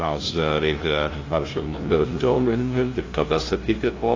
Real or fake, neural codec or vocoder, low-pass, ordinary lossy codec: fake; codec, 16 kHz, 0.5 kbps, FunCodec, trained on LibriTTS, 25 frames a second; 7.2 kHz; AAC, 32 kbps